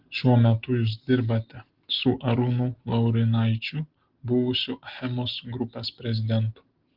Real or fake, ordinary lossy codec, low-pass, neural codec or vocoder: real; Opus, 16 kbps; 5.4 kHz; none